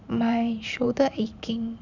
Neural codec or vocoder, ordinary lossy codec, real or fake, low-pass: vocoder, 44.1 kHz, 128 mel bands every 512 samples, BigVGAN v2; none; fake; 7.2 kHz